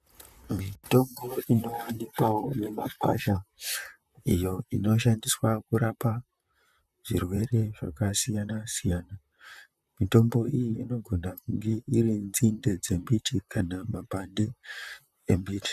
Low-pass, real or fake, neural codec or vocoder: 14.4 kHz; fake; vocoder, 44.1 kHz, 128 mel bands, Pupu-Vocoder